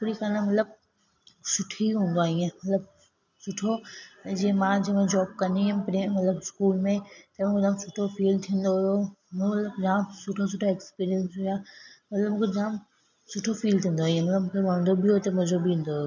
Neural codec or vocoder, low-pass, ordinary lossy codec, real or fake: none; 7.2 kHz; none; real